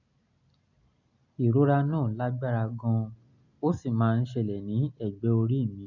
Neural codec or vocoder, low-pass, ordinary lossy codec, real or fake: none; 7.2 kHz; none; real